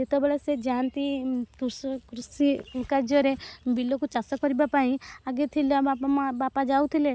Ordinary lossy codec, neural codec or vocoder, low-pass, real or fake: none; none; none; real